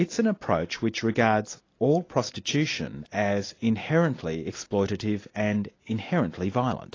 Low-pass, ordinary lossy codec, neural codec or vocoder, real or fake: 7.2 kHz; AAC, 32 kbps; none; real